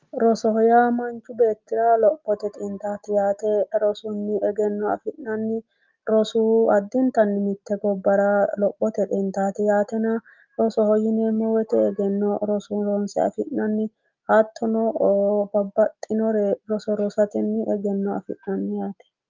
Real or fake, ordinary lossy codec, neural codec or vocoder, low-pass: real; Opus, 32 kbps; none; 7.2 kHz